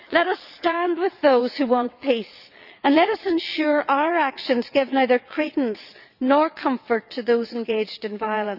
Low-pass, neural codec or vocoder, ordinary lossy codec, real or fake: 5.4 kHz; vocoder, 22.05 kHz, 80 mel bands, WaveNeXt; none; fake